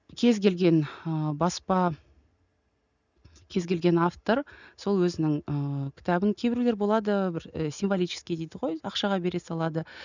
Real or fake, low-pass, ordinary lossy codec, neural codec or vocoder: real; 7.2 kHz; none; none